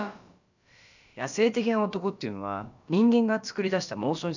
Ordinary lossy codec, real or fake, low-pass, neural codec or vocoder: none; fake; 7.2 kHz; codec, 16 kHz, about 1 kbps, DyCAST, with the encoder's durations